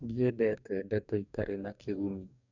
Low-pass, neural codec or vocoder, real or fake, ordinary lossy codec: 7.2 kHz; codec, 44.1 kHz, 2.6 kbps, DAC; fake; none